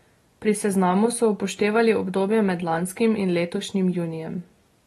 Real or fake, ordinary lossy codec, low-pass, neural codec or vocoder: real; AAC, 32 kbps; 14.4 kHz; none